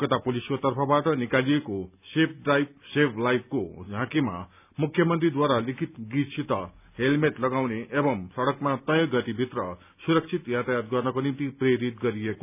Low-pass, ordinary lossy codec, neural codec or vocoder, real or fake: 3.6 kHz; none; none; real